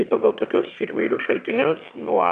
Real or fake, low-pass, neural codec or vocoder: fake; 9.9 kHz; autoencoder, 22.05 kHz, a latent of 192 numbers a frame, VITS, trained on one speaker